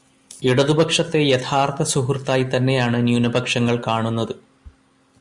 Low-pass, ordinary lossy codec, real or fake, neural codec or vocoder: 10.8 kHz; Opus, 64 kbps; real; none